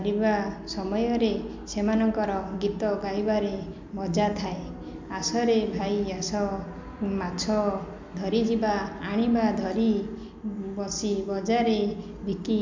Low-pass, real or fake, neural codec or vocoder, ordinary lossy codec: 7.2 kHz; real; none; MP3, 64 kbps